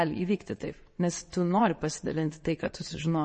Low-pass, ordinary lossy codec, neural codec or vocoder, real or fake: 9.9 kHz; MP3, 32 kbps; vocoder, 22.05 kHz, 80 mel bands, WaveNeXt; fake